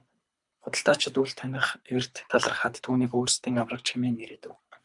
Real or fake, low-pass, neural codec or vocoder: fake; 10.8 kHz; codec, 24 kHz, 3 kbps, HILCodec